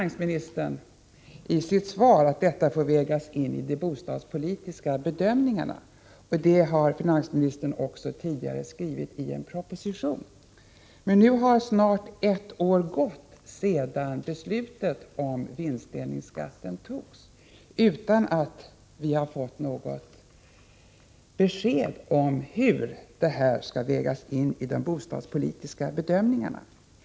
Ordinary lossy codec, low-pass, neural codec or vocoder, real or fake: none; none; none; real